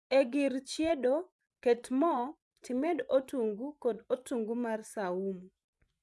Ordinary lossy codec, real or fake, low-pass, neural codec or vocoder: none; fake; none; vocoder, 24 kHz, 100 mel bands, Vocos